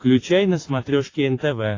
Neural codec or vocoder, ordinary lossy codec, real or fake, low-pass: none; AAC, 32 kbps; real; 7.2 kHz